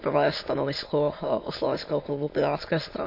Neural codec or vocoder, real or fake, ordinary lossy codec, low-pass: autoencoder, 22.05 kHz, a latent of 192 numbers a frame, VITS, trained on many speakers; fake; MP3, 32 kbps; 5.4 kHz